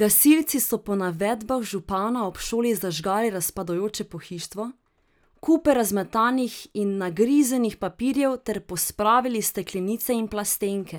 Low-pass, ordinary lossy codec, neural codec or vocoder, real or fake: none; none; none; real